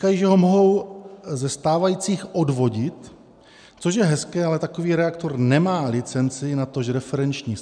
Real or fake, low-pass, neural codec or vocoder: real; 9.9 kHz; none